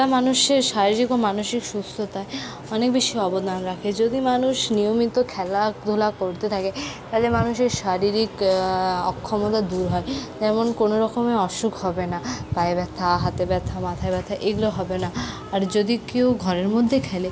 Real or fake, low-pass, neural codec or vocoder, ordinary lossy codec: real; none; none; none